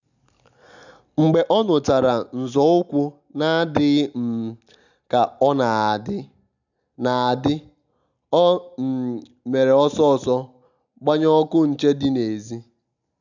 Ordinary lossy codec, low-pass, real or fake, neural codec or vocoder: none; 7.2 kHz; real; none